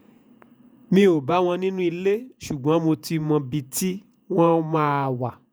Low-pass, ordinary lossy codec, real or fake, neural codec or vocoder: none; none; fake; vocoder, 48 kHz, 128 mel bands, Vocos